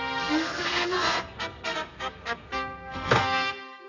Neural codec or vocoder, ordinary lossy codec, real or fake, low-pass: codec, 32 kHz, 1.9 kbps, SNAC; none; fake; 7.2 kHz